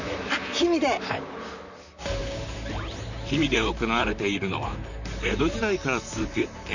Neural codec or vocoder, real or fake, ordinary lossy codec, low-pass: vocoder, 44.1 kHz, 128 mel bands, Pupu-Vocoder; fake; none; 7.2 kHz